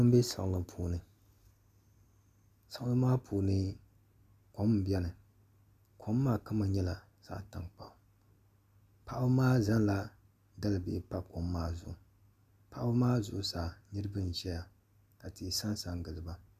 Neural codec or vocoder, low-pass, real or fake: none; 14.4 kHz; real